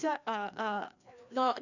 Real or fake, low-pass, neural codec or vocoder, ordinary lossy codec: fake; 7.2 kHz; codec, 16 kHz, 2 kbps, FreqCodec, larger model; none